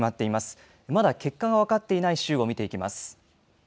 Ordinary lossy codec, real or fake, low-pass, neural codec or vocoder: none; real; none; none